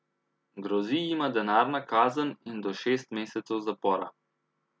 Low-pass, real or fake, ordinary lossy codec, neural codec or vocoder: none; real; none; none